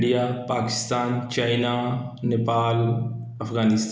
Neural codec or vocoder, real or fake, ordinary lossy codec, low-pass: none; real; none; none